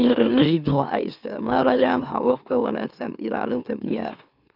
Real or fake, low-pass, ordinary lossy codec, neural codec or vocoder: fake; 5.4 kHz; none; autoencoder, 44.1 kHz, a latent of 192 numbers a frame, MeloTTS